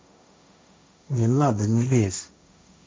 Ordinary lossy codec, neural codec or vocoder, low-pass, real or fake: none; codec, 16 kHz, 1.1 kbps, Voila-Tokenizer; none; fake